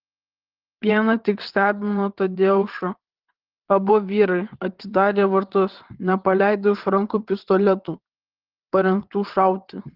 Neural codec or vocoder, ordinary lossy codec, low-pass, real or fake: vocoder, 22.05 kHz, 80 mel bands, WaveNeXt; Opus, 16 kbps; 5.4 kHz; fake